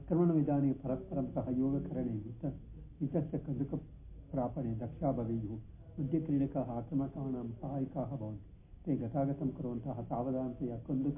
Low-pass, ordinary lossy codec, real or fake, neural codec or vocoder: 3.6 kHz; MP3, 24 kbps; real; none